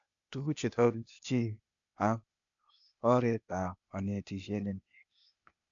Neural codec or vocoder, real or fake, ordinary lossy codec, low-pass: codec, 16 kHz, 0.8 kbps, ZipCodec; fake; MP3, 96 kbps; 7.2 kHz